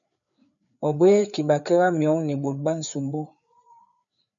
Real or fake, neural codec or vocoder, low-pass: fake; codec, 16 kHz, 4 kbps, FreqCodec, larger model; 7.2 kHz